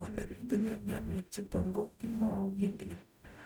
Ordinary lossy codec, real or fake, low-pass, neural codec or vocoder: none; fake; none; codec, 44.1 kHz, 0.9 kbps, DAC